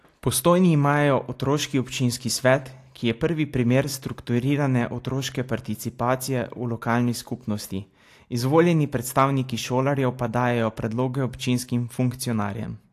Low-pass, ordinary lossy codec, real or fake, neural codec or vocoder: 14.4 kHz; AAC, 64 kbps; real; none